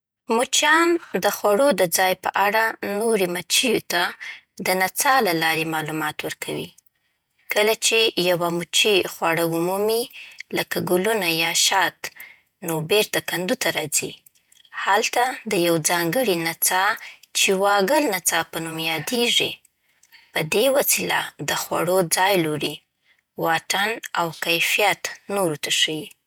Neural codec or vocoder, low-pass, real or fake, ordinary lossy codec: vocoder, 48 kHz, 128 mel bands, Vocos; none; fake; none